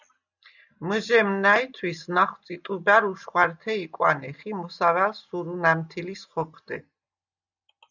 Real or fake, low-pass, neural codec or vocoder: real; 7.2 kHz; none